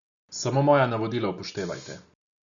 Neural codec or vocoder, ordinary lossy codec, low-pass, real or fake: none; none; 7.2 kHz; real